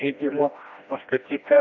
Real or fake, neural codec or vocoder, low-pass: fake; codec, 16 kHz, 1 kbps, FreqCodec, smaller model; 7.2 kHz